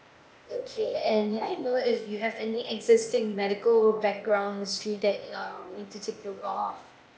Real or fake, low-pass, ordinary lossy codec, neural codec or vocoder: fake; none; none; codec, 16 kHz, 0.8 kbps, ZipCodec